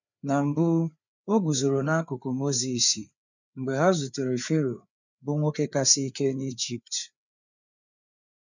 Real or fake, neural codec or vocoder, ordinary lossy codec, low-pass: fake; codec, 16 kHz, 4 kbps, FreqCodec, larger model; none; 7.2 kHz